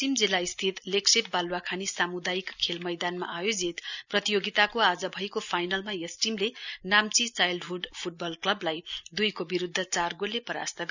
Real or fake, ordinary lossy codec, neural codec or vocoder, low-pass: real; none; none; 7.2 kHz